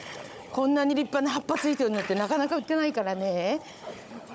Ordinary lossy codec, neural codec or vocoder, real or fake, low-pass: none; codec, 16 kHz, 16 kbps, FunCodec, trained on Chinese and English, 50 frames a second; fake; none